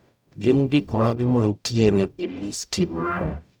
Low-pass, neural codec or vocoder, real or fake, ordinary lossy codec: 19.8 kHz; codec, 44.1 kHz, 0.9 kbps, DAC; fake; none